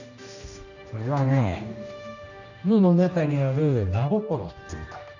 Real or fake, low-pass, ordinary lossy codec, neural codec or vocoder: fake; 7.2 kHz; none; codec, 16 kHz, 1 kbps, X-Codec, HuBERT features, trained on general audio